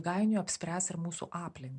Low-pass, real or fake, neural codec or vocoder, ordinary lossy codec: 10.8 kHz; real; none; MP3, 64 kbps